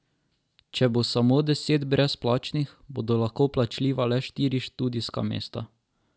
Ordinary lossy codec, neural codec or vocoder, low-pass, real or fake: none; none; none; real